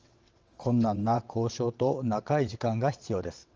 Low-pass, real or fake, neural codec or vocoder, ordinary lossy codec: 7.2 kHz; fake; vocoder, 22.05 kHz, 80 mel bands, WaveNeXt; Opus, 24 kbps